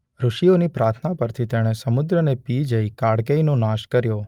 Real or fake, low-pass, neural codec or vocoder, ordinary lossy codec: real; 19.8 kHz; none; Opus, 32 kbps